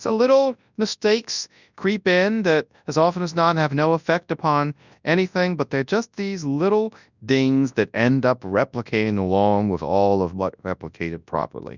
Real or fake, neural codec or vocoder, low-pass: fake; codec, 24 kHz, 0.9 kbps, WavTokenizer, large speech release; 7.2 kHz